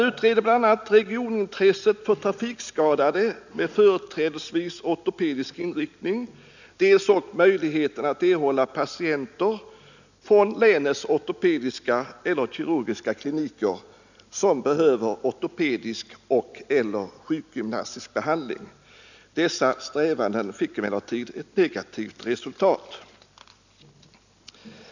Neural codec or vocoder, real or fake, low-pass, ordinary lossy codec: none; real; 7.2 kHz; none